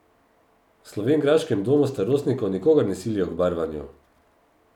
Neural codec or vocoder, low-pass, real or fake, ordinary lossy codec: none; 19.8 kHz; real; none